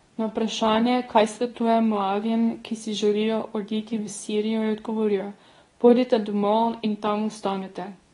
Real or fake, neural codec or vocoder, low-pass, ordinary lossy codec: fake; codec, 24 kHz, 0.9 kbps, WavTokenizer, medium speech release version 2; 10.8 kHz; AAC, 32 kbps